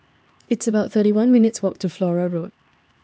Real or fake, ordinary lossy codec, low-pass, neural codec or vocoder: fake; none; none; codec, 16 kHz, 2 kbps, X-Codec, HuBERT features, trained on LibriSpeech